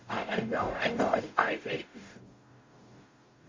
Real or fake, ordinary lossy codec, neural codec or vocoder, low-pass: fake; MP3, 32 kbps; codec, 44.1 kHz, 0.9 kbps, DAC; 7.2 kHz